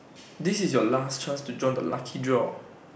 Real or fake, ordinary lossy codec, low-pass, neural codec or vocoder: real; none; none; none